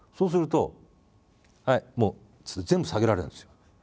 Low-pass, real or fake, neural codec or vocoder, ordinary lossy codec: none; real; none; none